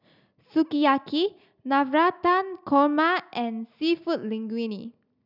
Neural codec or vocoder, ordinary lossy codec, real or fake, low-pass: none; none; real; 5.4 kHz